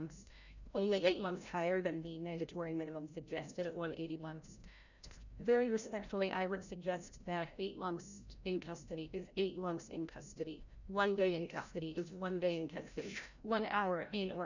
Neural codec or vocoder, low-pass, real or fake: codec, 16 kHz, 0.5 kbps, FreqCodec, larger model; 7.2 kHz; fake